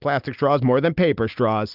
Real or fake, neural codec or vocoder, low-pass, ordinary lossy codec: real; none; 5.4 kHz; Opus, 32 kbps